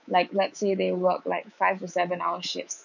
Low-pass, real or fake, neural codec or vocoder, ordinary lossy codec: 7.2 kHz; fake; codec, 24 kHz, 3.1 kbps, DualCodec; none